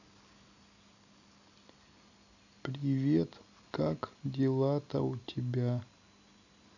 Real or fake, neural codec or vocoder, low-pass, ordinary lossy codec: real; none; 7.2 kHz; none